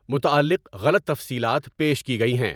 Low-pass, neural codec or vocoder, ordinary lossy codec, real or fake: none; vocoder, 48 kHz, 128 mel bands, Vocos; none; fake